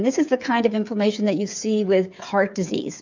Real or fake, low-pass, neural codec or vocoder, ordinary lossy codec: fake; 7.2 kHz; codec, 16 kHz, 8 kbps, FreqCodec, smaller model; MP3, 64 kbps